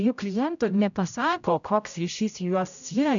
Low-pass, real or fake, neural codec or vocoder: 7.2 kHz; fake; codec, 16 kHz, 0.5 kbps, X-Codec, HuBERT features, trained on general audio